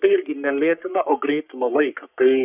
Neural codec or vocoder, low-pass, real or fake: codec, 44.1 kHz, 3.4 kbps, Pupu-Codec; 3.6 kHz; fake